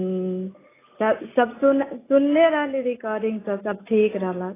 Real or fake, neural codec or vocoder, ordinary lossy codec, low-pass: real; none; AAC, 16 kbps; 3.6 kHz